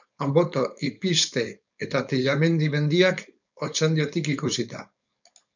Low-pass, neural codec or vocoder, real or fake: 7.2 kHz; codec, 16 kHz, 4.8 kbps, FACodec; fake